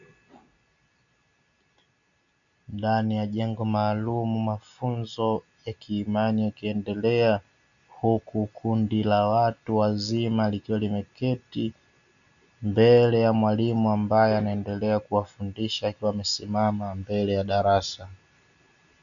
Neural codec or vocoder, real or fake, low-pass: none; real; 7.2 kHz